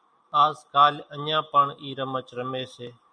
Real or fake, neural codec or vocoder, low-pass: real; none; 9.9 kHz